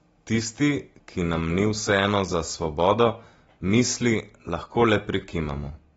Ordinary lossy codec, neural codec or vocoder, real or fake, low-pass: AAC, 24 kbps; none; real; 19.8 kHz